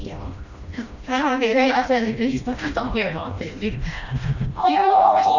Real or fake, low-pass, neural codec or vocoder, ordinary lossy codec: fake; 7.2 kHz; codec, 16 kHz, 1 kbps, FreqCodec, smaller model; none